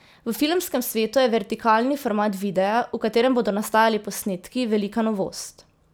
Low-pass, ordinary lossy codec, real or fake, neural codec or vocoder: none; none; real; none